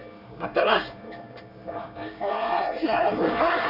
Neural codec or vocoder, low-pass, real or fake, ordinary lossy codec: codec, 24 kHz, 1 kbps, SNAC; 5.4 kHz; fake; none